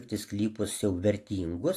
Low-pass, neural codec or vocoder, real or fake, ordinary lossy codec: 14.4 kHz; none; real; AAC, 64 kbps